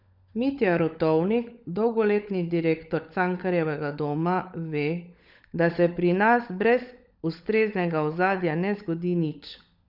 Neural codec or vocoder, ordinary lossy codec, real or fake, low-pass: codec, 16 kHz, 16 kbps, FunCodec, trained on LibriTTS, 50 frames a second; none; fake; 5.4 kHz